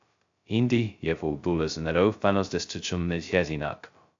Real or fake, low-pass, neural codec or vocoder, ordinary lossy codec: fake; 7.2 kHz; codec, 16 kHz, 0.2 kbps, FocalCodec; AAC, 64 kbps